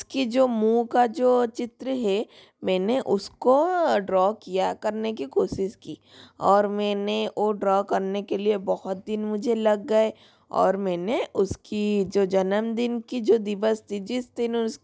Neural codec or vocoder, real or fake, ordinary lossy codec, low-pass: none; real; none; none